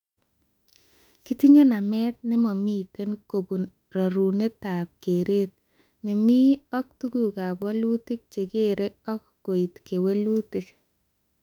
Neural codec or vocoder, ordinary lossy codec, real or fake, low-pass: autoencoder, 48 kHz, 32 numbers a frame, DAC-VAE, trained on Japanese speech; none; fake; 19.8 kHz